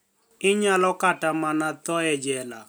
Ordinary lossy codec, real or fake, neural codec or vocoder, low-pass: none; real; none; none